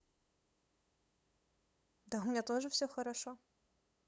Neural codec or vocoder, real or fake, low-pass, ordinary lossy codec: codec, 16 kHz, 8 kbps, FunCodec, trained on LibriTTS, 25 frames a second; fake; none; none